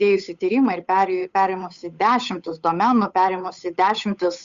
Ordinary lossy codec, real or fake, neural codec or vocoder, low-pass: Opus, 64 kbps; fake; codec, 16 kHz, 8 kbps, FunCodec, trained on Chinese and English, 25 frames a second; 7.2 kHz